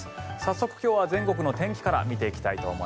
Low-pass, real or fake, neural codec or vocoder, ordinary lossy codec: none; real; none; none